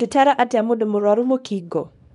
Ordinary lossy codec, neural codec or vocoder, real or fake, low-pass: none; codec, 24 kHz, 0.9 kbps, WavTokenizer, small release; fake; 10.8 kHz